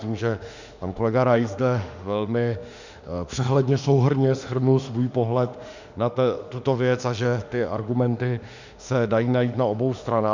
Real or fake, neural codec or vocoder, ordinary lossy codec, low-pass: fake; autoencoder, 48 kHz, 32 numbers a frame, DAC-VAE, trained on Japanese speech; Opus, 64 kbps; 7.2 kHz